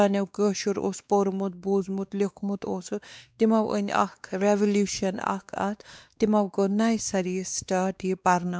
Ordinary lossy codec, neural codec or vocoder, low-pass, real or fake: none; codec, 16 kHz, 2 kbps, X-Codec, WavLM features, trained on Multilingual LibriSpeech; none; fake